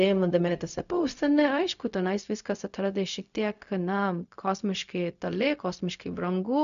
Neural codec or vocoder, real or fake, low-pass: codec, 16 kHz, 0.4 kbps, LongCat-Audio-Codec; fake; 7.2 kHz